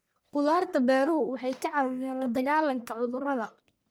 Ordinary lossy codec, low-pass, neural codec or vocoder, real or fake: none; none; codec, 44.1 kHz, 1.7 kbps, Pupu-Codec; fake